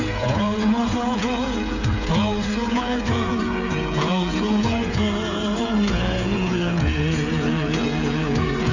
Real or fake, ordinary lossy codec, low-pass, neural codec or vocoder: fake; none; 7.2 kHz; codec, 16 kHz, 8 kbps, FreqCodec, smaller model